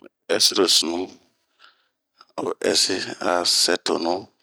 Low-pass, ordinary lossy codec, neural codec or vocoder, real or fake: none; none; vocoder, 44.1 kHz, 128 mel bands every 256 samples, BigVGAN v2; fake